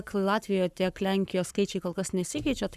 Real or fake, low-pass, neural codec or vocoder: fake; 14.4 kHz; codec, 44.1 kHz, 7.8 kbps, Pupu-Codec